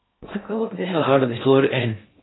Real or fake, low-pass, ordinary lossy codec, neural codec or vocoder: fake; 7.2 kHz; AAC, 16 kbps; codec, 16 kHz in and 24 kHz out, 0.6 kbps, FocalCodec, streaming, 2048 codes